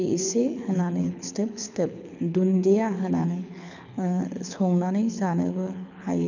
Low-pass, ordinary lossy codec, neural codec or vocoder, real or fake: 7.2 kHz; none; codec, 24 kHz, 6 kbps, HILCodec; fake